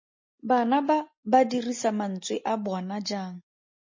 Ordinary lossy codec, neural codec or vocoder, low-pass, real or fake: MP3, 32 kbps; none; 7.2 kHz; real